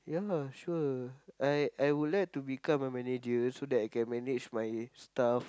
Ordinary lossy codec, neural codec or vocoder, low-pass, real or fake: none; none; none; real